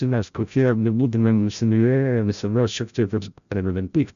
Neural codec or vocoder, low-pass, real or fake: codec, 16 kHz, 0.5 kbps, FreqCodec, larger model; 7.2 kHz; fake